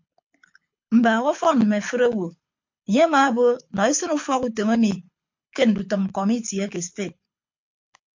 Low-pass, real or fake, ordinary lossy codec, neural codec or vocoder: 7.2 kHz; fake; MP3, 48 kbps; codec, 24 kHz, 6 kbps, HILCodec